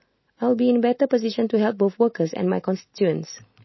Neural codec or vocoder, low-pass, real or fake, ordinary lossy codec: none; 7.2 kHz; real; MP3, 24 kbps